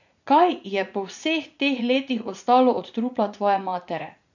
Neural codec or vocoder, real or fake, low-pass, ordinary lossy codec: vocoder, 44.1 kHz, 80 mel bands, Vocos; fake; 7.2 kHz; none